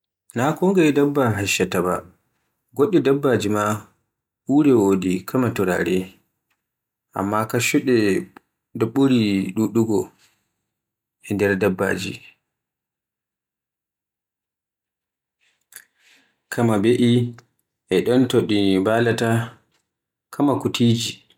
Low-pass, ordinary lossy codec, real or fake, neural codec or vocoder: 19.8 kHz; none; real; none